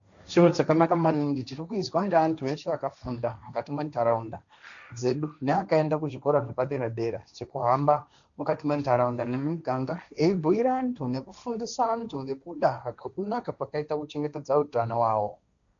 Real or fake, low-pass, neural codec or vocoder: fake; 7.2 kHz; codec, 16 kHz, 1.1 kbps, Voila-Tokenizer